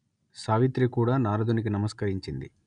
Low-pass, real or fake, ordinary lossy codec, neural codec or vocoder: 9.9 kHz; real; none; none